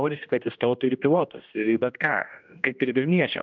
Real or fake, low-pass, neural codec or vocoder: fake; 7.2 kHz; codec, 16 kHz, 1 kbps, X-Codec, HuBERT features, trained on general audio